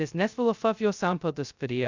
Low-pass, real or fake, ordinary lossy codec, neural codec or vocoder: 7.2 kHz; fake; Opus, 64 kbps; codec, 16 kHz, 0.2 kbps, FocalCodec